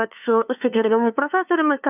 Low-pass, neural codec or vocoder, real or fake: 3.6 kHz; codec, 16 kHz, 2 kbps, FunCodec, trained on LibriTTS, 25 frames a second; fake